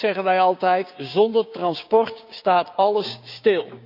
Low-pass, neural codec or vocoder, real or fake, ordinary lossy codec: 5.4 kHz; codec, 16 kHz, 6 kbps, DAC; fake; none